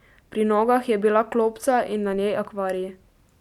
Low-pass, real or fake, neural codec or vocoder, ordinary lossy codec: 19.8 kHz; real; none; none